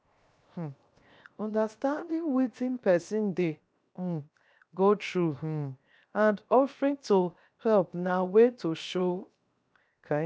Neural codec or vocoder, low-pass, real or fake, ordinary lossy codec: codec, 16 kHz, 0.7 kbps, FocalCodec; none; fake; none